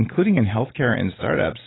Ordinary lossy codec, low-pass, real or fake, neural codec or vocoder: AAC, 16 kbps; 7.2 kHz; real; none